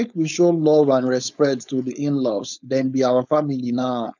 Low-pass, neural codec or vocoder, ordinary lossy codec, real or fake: 7.2 kHz; codec, 16 kHz, 4.8 kbps, FACodec; none; fake